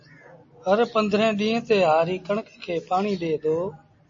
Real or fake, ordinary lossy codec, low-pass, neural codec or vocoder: real; MP3, 32 kbps; 7.2 kHz; none